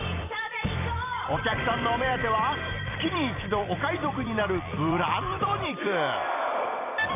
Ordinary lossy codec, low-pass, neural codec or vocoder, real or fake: none; 3.6 kHz; vocoder, 44.1 kHz, 128 mel bands every 256 samples, BigVGAN v2; fake